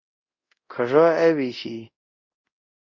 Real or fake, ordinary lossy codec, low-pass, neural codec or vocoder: fake; Opus, 64 kbps; 7.2 kHz; codec, 24 kHz, 0.5 kbps, DualCodec